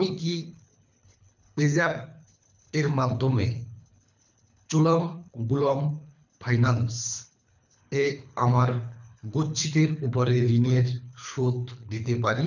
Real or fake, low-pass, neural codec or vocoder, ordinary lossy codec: fake; 7.2 kHz; codec, 24 kHz, 3 kbps, HILCodec; none